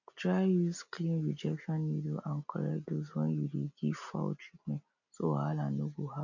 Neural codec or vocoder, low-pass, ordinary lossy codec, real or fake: none; 7.2 kHz; none; real